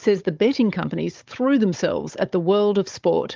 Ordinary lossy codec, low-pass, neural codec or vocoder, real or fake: Opus, 32 kbps; 7.2 kHz; codec, 16 kHz, 16 kbps, FunCodec, trained on Chinese and English, 50 frames a second; fake